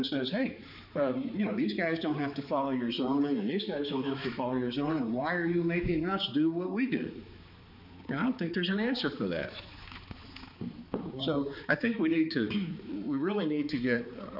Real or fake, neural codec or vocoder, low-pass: fake; codec, 16 kHz, 4 kbps, X-Codec, HuBERT features, trained on balanced general audio; 5.4 kHz